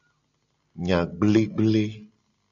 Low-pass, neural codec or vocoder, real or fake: 7.2 kHz; none; real